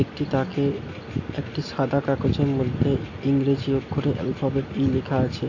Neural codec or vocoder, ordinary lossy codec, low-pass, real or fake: none; AAC, 48 kbps; 7.2 kHz; real